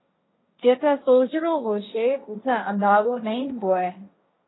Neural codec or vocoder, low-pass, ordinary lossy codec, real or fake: codec, 16 kHz, 1.1 kbps, Voila-Tokenizer; 7.2 kHz; AAC, 16 kbps; fake